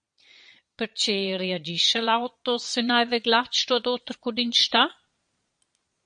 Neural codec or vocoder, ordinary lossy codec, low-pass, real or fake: vocoder, 22.05 kHz, 80 mel bands, Vocos; MP3, 48 kbps; 9.9 kHz; fake